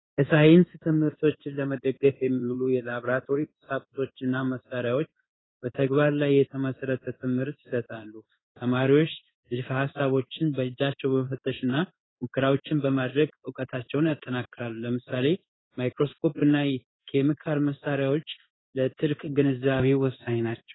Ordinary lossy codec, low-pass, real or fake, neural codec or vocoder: AAC, 16 kbps; 7.2 kHz; fake; codec, 16 kHz in and 24 kHz out, 1 kbps, XY-Tokenizer